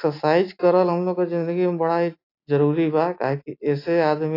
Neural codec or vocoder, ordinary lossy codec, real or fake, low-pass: none; AAC, 32 kbps; real; 5.4 kHz